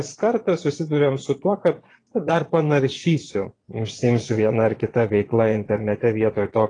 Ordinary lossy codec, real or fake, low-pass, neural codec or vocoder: AAC, 32 kbps; fake; 9.9 kHz; vocoder, 22.05 kHz, 80 mel bands, Vocos